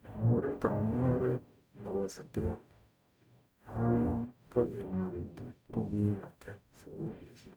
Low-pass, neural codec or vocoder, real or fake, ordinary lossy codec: none; codec, 44.1 kHz, 0.9 kbps, DAC; fake; none